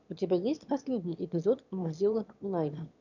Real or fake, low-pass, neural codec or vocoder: fake; 7.2 kHz; autoencoder, 22.05 kHz, a latent of 192 numbers a frame, VITS, trained on one speaker